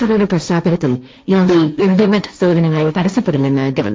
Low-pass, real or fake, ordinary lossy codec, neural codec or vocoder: none; fake; none; codec, 16 kHz, 1.1 kbps, Voila-Tokenizer